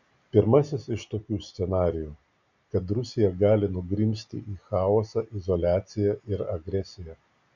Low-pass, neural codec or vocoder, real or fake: 7.2 kHz; none; real